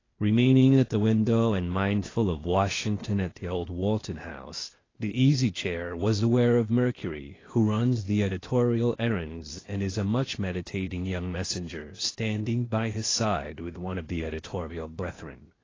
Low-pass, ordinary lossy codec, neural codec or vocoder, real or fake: 7.2 kHz; AAC, 32 kbps; codec, 16 kHz, 0.8 kbps, ZipCodec; fake